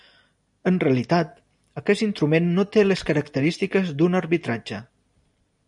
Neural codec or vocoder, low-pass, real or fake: none; 10.8 kHz; real